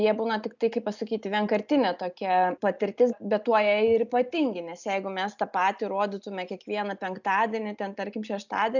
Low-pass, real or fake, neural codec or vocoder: 7.2 kHz; real; none